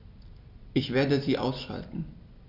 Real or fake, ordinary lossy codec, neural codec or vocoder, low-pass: real; none; none; 5.4 kHz